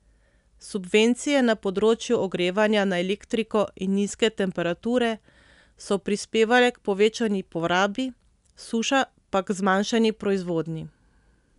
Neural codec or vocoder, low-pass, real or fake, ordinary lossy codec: none; 10.8 kHz; real; none